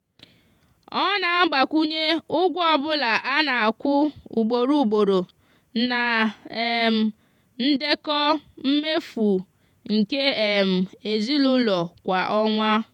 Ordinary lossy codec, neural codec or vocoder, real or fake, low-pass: none; vocoder, 48 kHz, 128 mel bands, Vocos; fake; 19.8 kHz